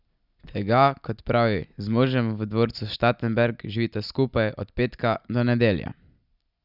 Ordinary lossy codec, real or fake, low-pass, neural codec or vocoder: none; real; 5.4 kHz; none